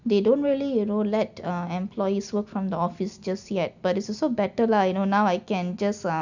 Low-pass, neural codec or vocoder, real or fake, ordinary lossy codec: 7.2 kHz; none; real; none